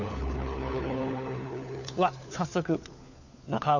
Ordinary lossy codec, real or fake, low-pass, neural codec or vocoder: none; fake; 7.2 kHz; codec, 16 kHz, 4 kbps, FunCodec, trained on LibriTTS, 50 frames a second